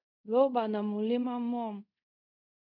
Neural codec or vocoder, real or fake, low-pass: codec, 24 kHz, 0.5 kbps, DualCodec; fake; 5.4 kHz